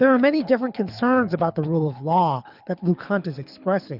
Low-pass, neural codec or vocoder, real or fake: 5.4 kHz; codec, 24 kHz, 6 kbps, HILCodec; fake